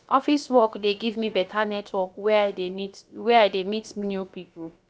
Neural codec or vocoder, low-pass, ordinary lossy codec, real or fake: codec, 16 kHz, about 1 kbps, DyCAST, with the encoder's durations; none; none; fake